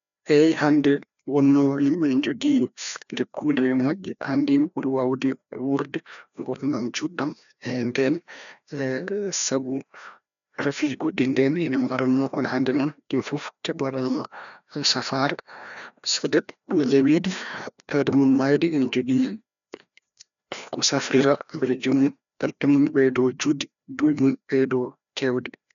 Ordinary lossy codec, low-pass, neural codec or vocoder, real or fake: none; 7.2 kHz; codec, 16 kHz, 1 kbps, FreqCodec, larger model; fake